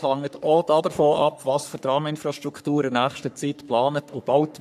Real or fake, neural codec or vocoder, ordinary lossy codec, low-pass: fake; codec, 44.1 kHz, 3.4 kbps, Pupu-Codec; none; 14.4 kHz